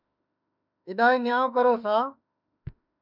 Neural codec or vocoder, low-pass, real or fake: autoencoder, 48 kHz, 32 numbers a frame, DAC-VAE, trained on Japanese speech; 5.4 kHz; fake